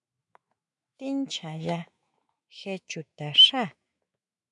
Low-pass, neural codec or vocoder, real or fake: 10.8 kHz; autoencoder, 48 kHz, 128 numbers a frame, DAC-VAE, trained on Japanese speech; fake